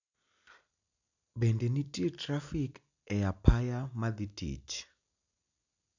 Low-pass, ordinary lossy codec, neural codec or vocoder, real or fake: 7.2 kHz; none; none; real